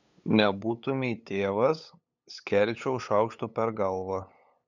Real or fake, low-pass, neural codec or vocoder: fake; 7.2 kHz; codec, 16 kHz, 8 kbps, FunCodec, trained on LibriTTS, 25 frames a second